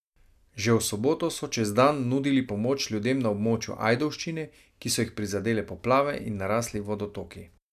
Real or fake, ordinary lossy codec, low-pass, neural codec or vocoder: real; none; 14.4 kHz; none